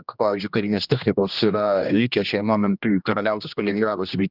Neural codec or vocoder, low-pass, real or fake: codec, 16 kHz, 1 kbps, X-Codec, HuBERT features, trained on general audio; 5.4 kHz; fake